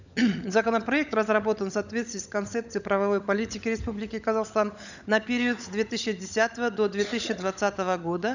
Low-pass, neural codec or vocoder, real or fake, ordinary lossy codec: 7.2 kHz; codec, 16 kHz, 16 kbps, FunCodec, trained on LibriTTS, 50 frames a second; fake; none